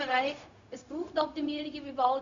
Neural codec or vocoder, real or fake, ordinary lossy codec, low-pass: codec, 16 kHz, 0.4 kbps, LongCat-Audio-Codec; fake; Opus, 64 kbps; 7.2 kHz